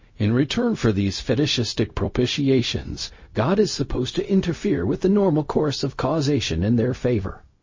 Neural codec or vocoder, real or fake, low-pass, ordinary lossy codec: codec, 16 kHz, 0.4 kbps, LongCat-Audio-Codec; fake; 7.2 kHz; MP3, 32 kbps